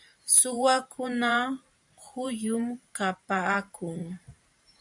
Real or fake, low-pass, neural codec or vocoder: fake; 10.8 kHz; vocoder, 44.1 kHz, 128 mel bands every 512 samples, BigVGAN v2